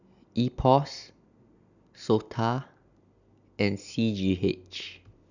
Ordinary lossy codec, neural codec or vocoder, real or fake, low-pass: none; none; real; 7.2 kHz